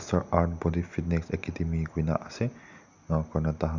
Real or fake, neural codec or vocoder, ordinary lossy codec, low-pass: real; none; none; 7.2 kHz